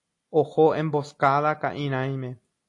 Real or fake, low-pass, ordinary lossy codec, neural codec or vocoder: real; 10.8 kHz; AAC, 48 kbps; none